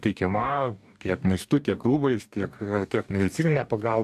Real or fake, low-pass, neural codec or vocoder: fake; 14.4 kHz; codec, 44.1 kHz, 2.6 kbps, DAC